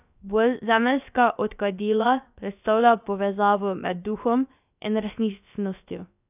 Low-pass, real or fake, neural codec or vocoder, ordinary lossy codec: 3.6 kHz; fake; codec, 16 kHz, about 1 kbps, DyCAST, with the encoder's durations; none